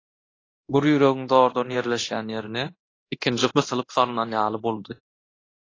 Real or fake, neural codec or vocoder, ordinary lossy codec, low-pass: fake; codec, 24 kHz, 0.9 kbps, DualCodec; AAC, 32 kbps; 7.2 kHz